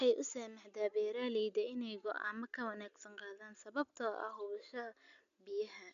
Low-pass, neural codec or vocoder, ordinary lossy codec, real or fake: 7.2 kHz; none; none; real